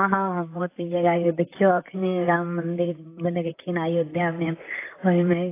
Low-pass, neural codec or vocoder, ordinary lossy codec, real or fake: 3.6 kHz; vocoder, 44.1 kHz, 128 mel bands, Pupu-Vocoder; AAC, 24 kbps; fake